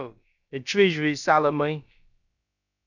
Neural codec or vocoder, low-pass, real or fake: codec, 16 kHz, about 1 kbps, DyCAST, with the encoder's durations; 7.2 kHz; fake